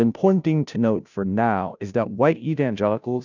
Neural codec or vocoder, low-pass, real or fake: codec, 16 kHz, 0.5 kbps, FunCodec, trained on Chinese and English, 25 frames a second; 7.2 kHz; fake